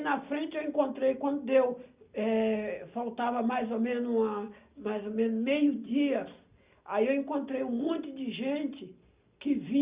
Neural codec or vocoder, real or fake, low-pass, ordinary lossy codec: none; real; 3.6 kHz; Opus, 64 kbps